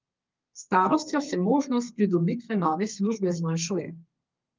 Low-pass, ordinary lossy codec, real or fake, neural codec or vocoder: 7.2 kHz; Opus, 24 kbps; fake; codec, 32 kHz, 1.9 kbps, SNAC